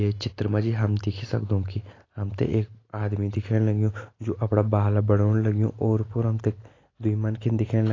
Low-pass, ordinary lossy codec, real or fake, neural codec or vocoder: 7.2 kHz; AAC, 32 kbps; real; none